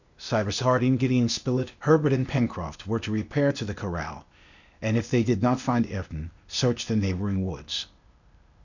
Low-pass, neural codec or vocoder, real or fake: 7.2 kHz; codec, 16 kHz, 0.8 kbps, ZipCodec; fake